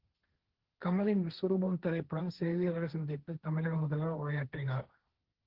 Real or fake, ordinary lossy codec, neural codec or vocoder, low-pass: fake; Opus, 16 kbps; codec, 16 kHz, 1.1 kbps, Voila-Tokenizer; 5.4 kHz